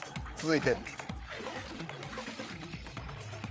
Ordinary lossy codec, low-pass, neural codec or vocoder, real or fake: none; none; codec, 16 kHz, 8 kbps, FreqCodec, larger model; fake